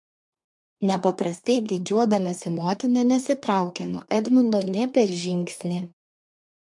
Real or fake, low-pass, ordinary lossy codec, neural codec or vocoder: fake; 10.8 kHz; MP3, 64 kbps; codec, 24 kHz, 1 kbps, SNAC